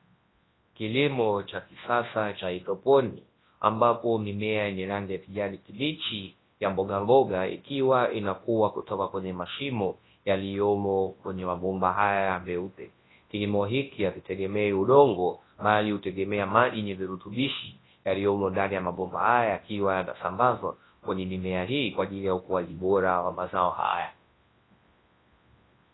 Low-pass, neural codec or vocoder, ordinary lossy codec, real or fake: 7.2 kHz; codec, 24 kHz, 0.9 kbps, WavTokenizer, large speech release; AAC, 16 kbps; fake